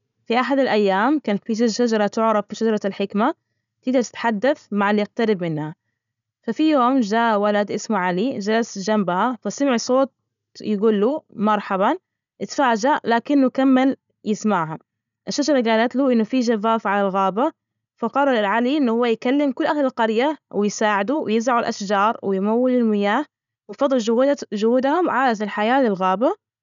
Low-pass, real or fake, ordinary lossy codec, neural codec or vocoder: 7.2 kHz; real; none; none